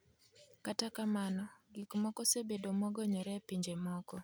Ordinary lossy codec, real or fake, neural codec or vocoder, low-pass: none; real; none; none